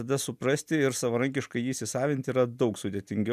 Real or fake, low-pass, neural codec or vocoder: real; 14.4 kHz; none